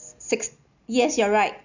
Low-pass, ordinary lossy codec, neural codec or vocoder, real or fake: 7.2 kHz; none; none; real